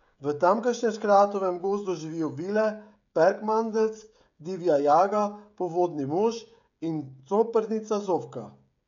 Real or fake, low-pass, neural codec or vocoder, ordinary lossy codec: fake; 7.2 kHz; codec, 16 kHz, 16 kbps, FreqCodec, smaller model; MP3, 96 kbps